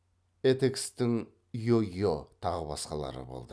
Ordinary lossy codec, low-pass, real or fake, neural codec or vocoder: none; none; real; none